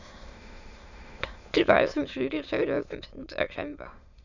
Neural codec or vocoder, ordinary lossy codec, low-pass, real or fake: autoencoder, 22.05 kHz, a latent of 192 numbers a frame, VITS, trained on many speakers; none; 7.2 kHz; fake